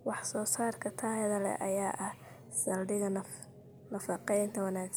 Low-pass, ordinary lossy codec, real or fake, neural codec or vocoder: none; none; real; none